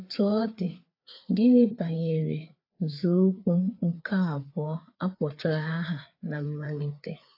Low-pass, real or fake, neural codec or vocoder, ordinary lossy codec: 5.4 kHz; fake; codec, 16 kHz, 4 kbps, FreqCodec, larger model; AAC, 32 kbps